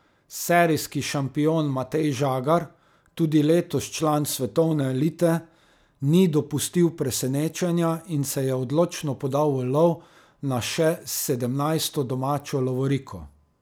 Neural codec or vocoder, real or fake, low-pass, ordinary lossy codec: none; real; none; none